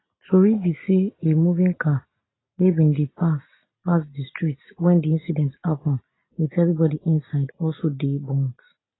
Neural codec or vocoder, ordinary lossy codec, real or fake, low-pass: none; AAC, 16 kbps; real; 7.2 kHz